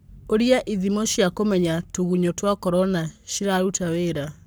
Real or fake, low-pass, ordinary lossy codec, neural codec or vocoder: fake; none; none; codec, 44.1 kHz, 7.8 kbps, Pupu-Codec